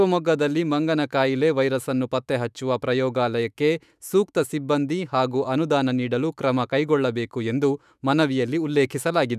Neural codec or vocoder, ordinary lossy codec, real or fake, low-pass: autoencoder, 48 kHz, 128 numbers a frame, DAC-VAE, trained on Japanese speech; none; fake; 14.4 kHz